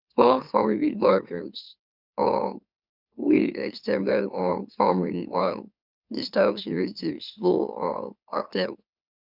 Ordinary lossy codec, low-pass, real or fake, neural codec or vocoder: none; 5.4 kHz; fake; autoencoder, 44.1 kHz, a latent of 192 numbers a frame, MeloTTS